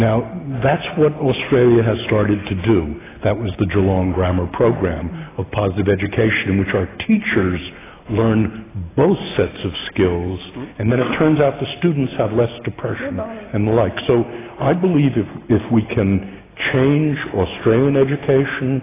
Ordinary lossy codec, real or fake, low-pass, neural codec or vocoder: AAC, 16 kbps; real; 3.6 kHz; none